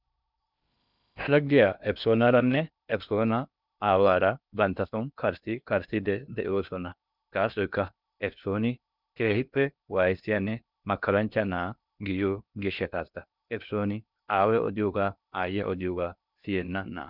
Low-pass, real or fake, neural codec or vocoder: 5.4 kHz; fake; codec, 16 kHz in and 24 kHz out, 0.8 kbps, FocalCodec, streaming, 65536 codes